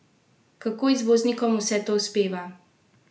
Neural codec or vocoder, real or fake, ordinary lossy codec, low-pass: none; real; none; none